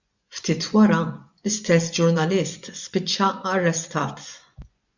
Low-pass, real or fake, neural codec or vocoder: 7.2 kHz; fake; vocoder, 24 kHz, 100 mel bands, Vocos